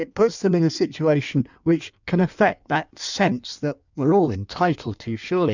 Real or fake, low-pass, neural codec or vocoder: fake; 7.2 kHz; codec, 16 kHz in and 24 kHz out, 1.1 kbps, FireRedTTS-2 codec